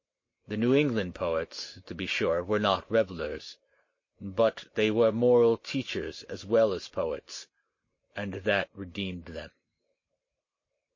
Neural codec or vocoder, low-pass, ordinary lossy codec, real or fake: none; 7.2 kHz; MP3, 32 kbps; real